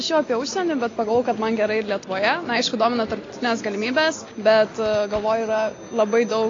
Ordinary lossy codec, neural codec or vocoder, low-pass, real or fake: AAC, 32 kbps; none; 7.2 kHz; real